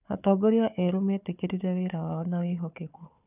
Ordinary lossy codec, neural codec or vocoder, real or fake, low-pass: none; codec, 16 kHz, 4 kbps, FunCodec, trained on Chinese and English, 50 frames a second; fake; 3.6 kHz